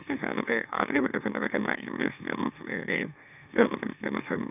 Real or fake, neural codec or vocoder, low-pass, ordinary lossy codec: fake; autoencoder, 44.1 kHz, a latent of 192 numbers a frame, MeloTTS; 3.6 kHz; none